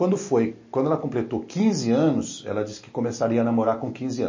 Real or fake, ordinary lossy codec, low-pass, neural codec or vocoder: real; MP3, 32 kbps; 7.2 kHz; none